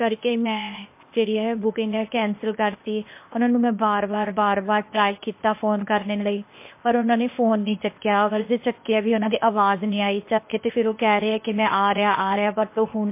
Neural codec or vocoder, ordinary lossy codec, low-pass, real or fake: codec, 16 kHz, 0.8 kbps, ZipCodec; MP3, 24 kbps; 3.6 kHz; fake